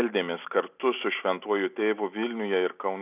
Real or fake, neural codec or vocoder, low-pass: real; none; 3.6 kHz